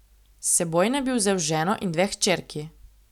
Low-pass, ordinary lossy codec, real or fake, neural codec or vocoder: 19.8 kHz; none; real; none